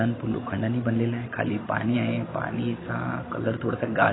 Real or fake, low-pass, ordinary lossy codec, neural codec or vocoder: real; 7.2 kHz; AAC, 16 kbps; none